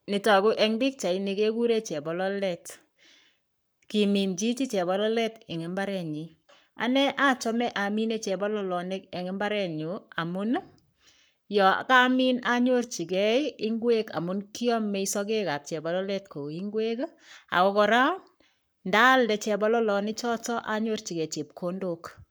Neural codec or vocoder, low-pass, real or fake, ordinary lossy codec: codec, 44.1 kHz, 7.8 kbps, Pupu-Codec; none; fake; none